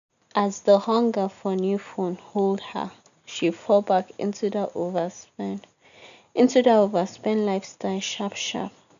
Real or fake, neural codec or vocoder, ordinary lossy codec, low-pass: real; none; none; 7.2 kHz